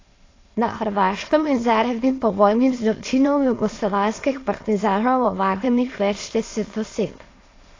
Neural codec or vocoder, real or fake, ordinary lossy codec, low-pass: autoencoder, 22.05 kHz, a latent of 192 numbers a frame, VITS, trained on many speakers; fake; AAC, 32 kbps; 7.2 kHz